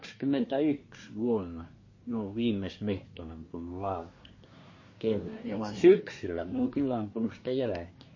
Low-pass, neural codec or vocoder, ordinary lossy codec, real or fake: 7.2 kHz; codec, 24 kHz, 1 kbps, SNAC; MP3, 32 kbps; fake